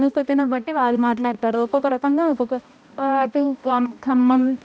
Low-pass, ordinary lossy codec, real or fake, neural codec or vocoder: none; none; fake; codec, 16 kHz, 1 kbps, X-Codec, HuBERT features, trained on balanced general audio